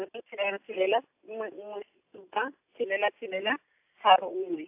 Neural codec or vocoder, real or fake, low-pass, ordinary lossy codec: none; real; 3.6 kHz; AAC, 32 kbps